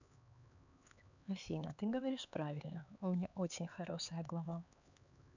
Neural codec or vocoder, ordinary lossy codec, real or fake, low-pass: codec, 16 kHz, 4 kbps, X-Codec, HuBERT features, trained on LibriSpeech; none; fake; 7.2 kHz